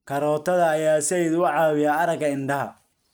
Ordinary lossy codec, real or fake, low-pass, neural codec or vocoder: none; real; none; none